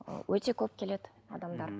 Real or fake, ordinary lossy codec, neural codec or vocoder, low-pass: real; none; none; none